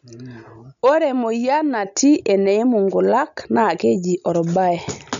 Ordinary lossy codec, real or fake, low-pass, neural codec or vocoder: none; real; 7.2 kHz; none